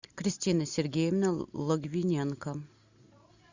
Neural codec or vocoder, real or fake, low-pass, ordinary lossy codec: none; real; 7.2 kHz; Opus, 64 kbps